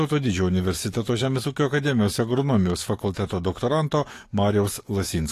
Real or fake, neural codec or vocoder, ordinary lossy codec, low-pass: fake; codec, 44.1 kHz, 7.8 kbps, DAC; AAC, 48 kbps; 14.4 kHz